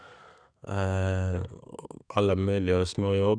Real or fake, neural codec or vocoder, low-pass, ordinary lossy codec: fake; codec, 32 kHz, 1.9 kbps, SNAC; 9.9 kHz; none